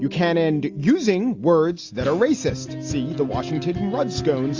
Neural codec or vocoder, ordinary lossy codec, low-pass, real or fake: none; AAC, 48 kbps; 7.2 kHz; real